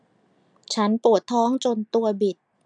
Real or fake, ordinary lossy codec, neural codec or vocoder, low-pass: real; none; none; 9.9 kHz